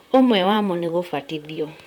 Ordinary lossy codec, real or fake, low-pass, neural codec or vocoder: none; fake; 19.8 kHz; vocoder, 44.1 kHz, 128 mel bands, Pupu-Vocoder